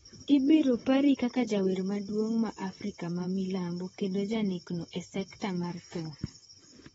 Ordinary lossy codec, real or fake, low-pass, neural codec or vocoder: AAC, 24 kbps; fake; 19.8 kHz; vocoder, 44.1 kHz, 128 mel bands, Pupu-Vocoder